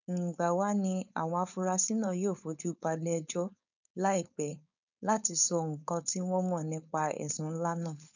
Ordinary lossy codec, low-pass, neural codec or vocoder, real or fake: none; 7.2 kHz; codec, 16 kHz, 4.8 kbps, FACodec; fake